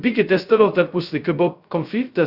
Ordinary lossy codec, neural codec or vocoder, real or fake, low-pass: Opus, 64 kbps; codec, 16 kHz, 0.2 kbps, FocalCodec; fake; 5.4 kHz